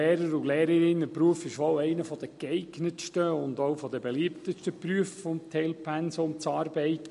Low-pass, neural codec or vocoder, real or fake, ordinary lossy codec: 14.4 kHz; none; real; MP3, 48 kbps